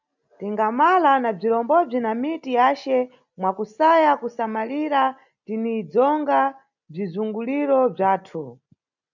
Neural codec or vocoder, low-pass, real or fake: none; 7.2 kHz; real